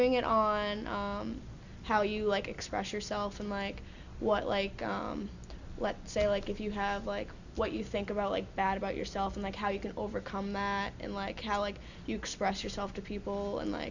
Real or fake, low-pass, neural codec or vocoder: real; 7.2 kHz; none